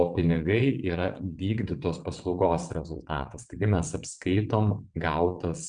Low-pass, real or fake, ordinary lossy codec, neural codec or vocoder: 9.9 kHz; fake; MP3, 96 kbps; vocoder, 22.05 kHz, 80 mel bands, WaveNeXt